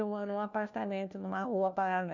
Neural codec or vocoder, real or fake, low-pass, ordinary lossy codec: codec, 16 kHz, 1 kbps, FunCodec, trained on LibriTTS, 50 frames a second; fake; 7.2 kHz; none